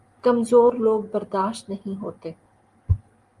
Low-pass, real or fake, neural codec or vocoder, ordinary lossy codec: 10.8 kHz; real; none; Opus, 32 kbps